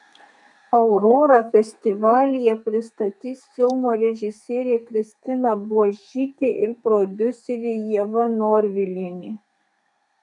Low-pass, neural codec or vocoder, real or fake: 10.8 kHz; codec, 32 kHz, 1.9 kbps, SNAC; fake